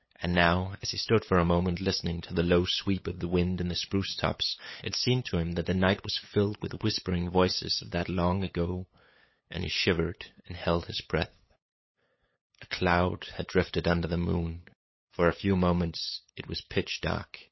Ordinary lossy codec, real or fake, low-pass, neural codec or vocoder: MP3, 24 kbps; fake; 7.2 kHz; codec, 16 kHz, 8 kbps, FunCodec, trained on LibriTTS, 25 frames a second